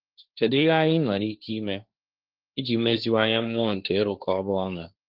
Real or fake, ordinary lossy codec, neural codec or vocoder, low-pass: fake; Opus, 24 kbps; codec, 16 kHz, 1.1 kbps, Voila-Tokenizer; 5.4 kHz